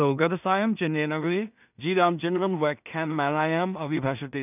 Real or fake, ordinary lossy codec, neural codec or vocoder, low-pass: fake; none; codec, 16 kHz in and 24 kHz out, 0.4 kbps, LongCat-Audio-Codec, two codebook decoder; 3.6 kHz